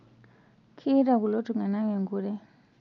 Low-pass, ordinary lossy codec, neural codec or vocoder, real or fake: 7.2 kHz; none; none; real